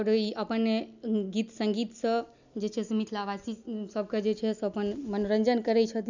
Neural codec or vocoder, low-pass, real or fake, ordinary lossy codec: none; 7.2 kHz; real; none